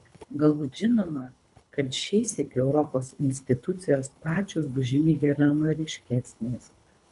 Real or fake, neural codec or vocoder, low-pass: fake; codec, 24 kHz, 3 kbps, HILCodec; 10.8 kHz